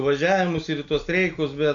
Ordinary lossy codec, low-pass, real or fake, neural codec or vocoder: AAC, 64 kbps; 7.2 kHz; real; none